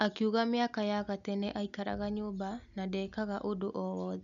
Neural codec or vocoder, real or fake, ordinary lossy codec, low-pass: none; real; none; 7.2 kHz